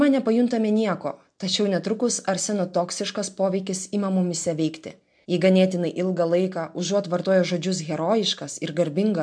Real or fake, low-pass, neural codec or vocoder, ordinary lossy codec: real; 9.9 kHz; none; MP3, 64 kbps